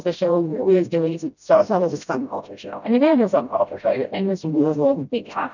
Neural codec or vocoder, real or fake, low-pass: codec, 16 kHz, 0.5 kbps, FreqCodec, smaller model; fake; 7.2 kHz